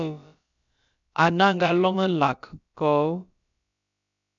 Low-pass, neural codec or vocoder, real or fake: 7.2 kHz; codec, 16 kHz, about 1 kbps, DyCAST, with the encoder's durations; fake